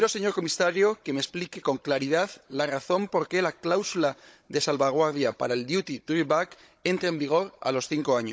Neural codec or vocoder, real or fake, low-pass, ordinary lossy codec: codec, 16 kHz, 16 kbps, FunCodec, trained on Chinese and English, 50 frames a second; fake; none; none